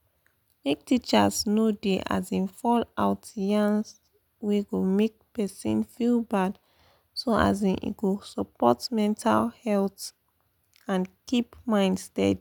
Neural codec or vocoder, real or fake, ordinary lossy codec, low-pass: none; real; none; 19.8 kHz